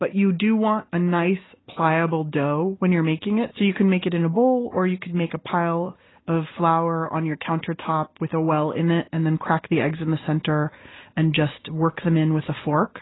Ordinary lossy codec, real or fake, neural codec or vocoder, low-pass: AAC, 16 kbps; real; none; 7.2 kHz